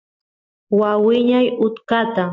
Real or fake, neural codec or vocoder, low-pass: real; none; 7.2 kHz